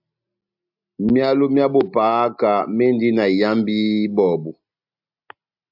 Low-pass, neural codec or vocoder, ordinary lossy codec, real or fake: 5.4 kHz; none; AAC, 48 kbps; real